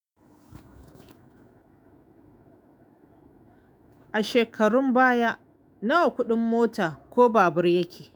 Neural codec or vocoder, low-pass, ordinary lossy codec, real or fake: autoencoder, 48 kHz, 128 numbers a frame, DAC-VAE, trained on Japanese speech; none; none; fake